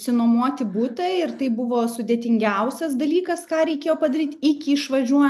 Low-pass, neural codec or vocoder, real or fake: 14.4 kHz; none; real